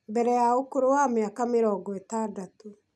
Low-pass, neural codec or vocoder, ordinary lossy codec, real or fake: none; none; none; real